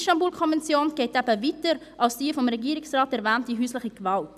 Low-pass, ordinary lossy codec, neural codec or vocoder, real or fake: 14.4 kHz; none; none; real